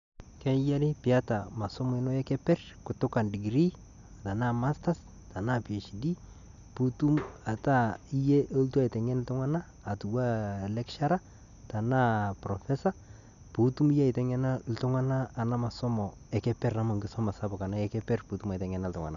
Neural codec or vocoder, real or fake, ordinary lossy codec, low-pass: none; real; MP3, 96 kbps; 7.2 kHz